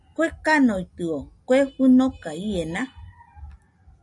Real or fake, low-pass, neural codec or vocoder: real; 10.8 kHz; none